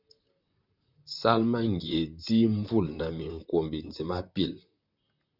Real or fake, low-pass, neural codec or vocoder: fake; 5.4 kHz; vocoder, 44.1 kHz, 128 mel bands, Pupu-Vocoder